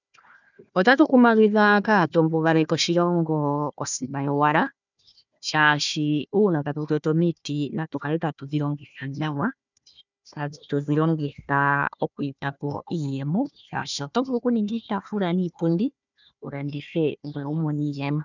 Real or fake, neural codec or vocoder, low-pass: fake; codec, 16 kHz, 1 kbps, FunCodec, trained on Chinese and English, 50 frames a second; 7.2 kHz